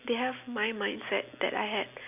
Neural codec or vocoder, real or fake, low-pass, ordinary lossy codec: none; real; 3.6 kHz; none